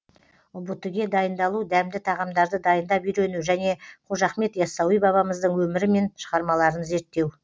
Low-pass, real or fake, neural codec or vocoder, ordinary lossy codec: none; real; none; none